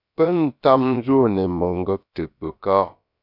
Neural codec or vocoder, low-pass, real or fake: codec, 16 kHz, about 1 kbps, DyCAST, with the encoder's durations; 5.4 kHz; fake